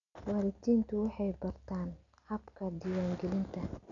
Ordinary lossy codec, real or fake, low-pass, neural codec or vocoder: none; real; 7.2 kHz; none